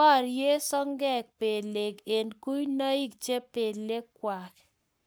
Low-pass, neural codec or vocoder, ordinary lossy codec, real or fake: none; codec, 44.1 kHz, 7.8 kbps, Pupu-Codec; none; fake